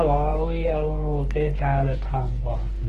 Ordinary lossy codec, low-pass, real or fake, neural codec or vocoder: Opus, 16 kbps; 14.4 kHz; fake; codec, 44.1 kHz, 2.6 kbps, SNAC